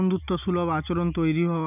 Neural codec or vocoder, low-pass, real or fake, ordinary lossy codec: none; 3.6 kHz; real; none